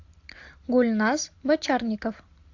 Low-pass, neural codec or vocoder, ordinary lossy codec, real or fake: 7.2 kHz; none; AAC, 48 kbps; real